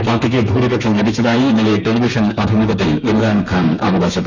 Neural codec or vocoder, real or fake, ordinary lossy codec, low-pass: codec, 32 kHz, 1.9 kbps, SNAC; fake; none; 7.2 kHz